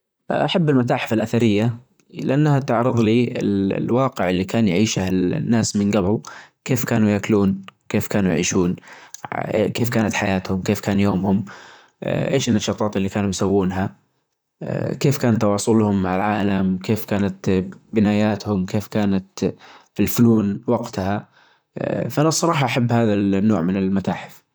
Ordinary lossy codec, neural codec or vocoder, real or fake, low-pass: none; vocoder, 44.1 kHz, 128 mel bands, Pupu-Vocoder; fake; none